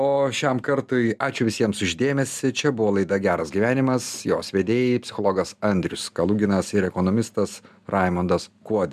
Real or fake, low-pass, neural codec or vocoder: real; 14.4 kHz; none